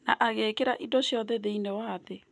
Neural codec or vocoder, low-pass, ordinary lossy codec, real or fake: none; none; none; real